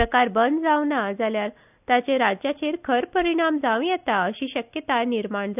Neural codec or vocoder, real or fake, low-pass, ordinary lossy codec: none; real; 3.6 kHz; none